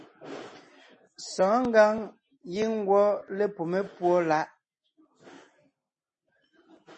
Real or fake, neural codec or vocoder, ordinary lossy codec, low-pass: real; none; MP3, 32 kbps; 10.8 kHz